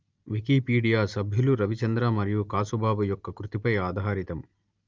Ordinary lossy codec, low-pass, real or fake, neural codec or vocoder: Opus, 24 kbps; 7.2 kHz; real; none